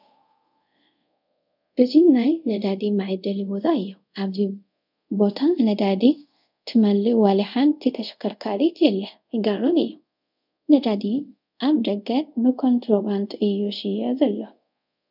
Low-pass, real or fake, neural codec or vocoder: 5.4 kHz; fake; codec, 24 kHz, 0.5 kbps, DualCodec